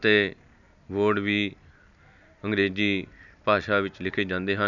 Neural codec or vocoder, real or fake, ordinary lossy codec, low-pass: none; real; none; 7.2 kHz